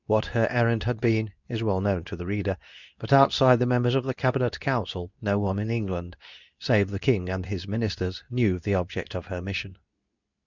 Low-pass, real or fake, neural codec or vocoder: 7.2 kHz; fake; codec, 24 kHz, 0.9 kbps, WavTokenizer, medium speech release version 2